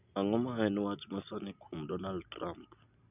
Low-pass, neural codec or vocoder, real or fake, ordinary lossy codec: 3.6 kHz; none; real; none